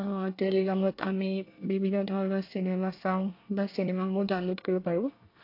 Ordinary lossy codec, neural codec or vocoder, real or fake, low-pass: AAC, 32 kbps; codec, 24 kHz, 1 kbps, SNAC; fake; 5.4 kHz